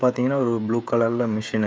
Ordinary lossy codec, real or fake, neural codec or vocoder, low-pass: none; fake; codec, 16 kHz, 16 kbps, FreqCodec, smaller model; none